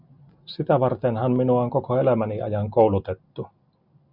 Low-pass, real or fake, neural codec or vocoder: 5.4 kHz; real; none